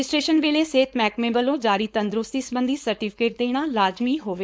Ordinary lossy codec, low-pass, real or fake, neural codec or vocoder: none; none; fake; codec, 16 kHz, 4.8 kbps, FACodec